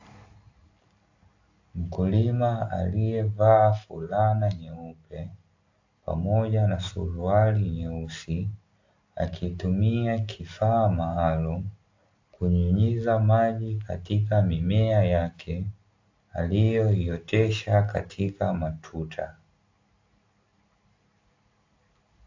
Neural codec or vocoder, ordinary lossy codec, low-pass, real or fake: none; AAC, 48 kbps; 7.2 kHz; real